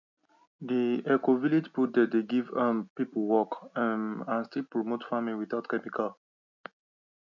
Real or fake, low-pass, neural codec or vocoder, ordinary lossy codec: real; 7.2 kHz; none; none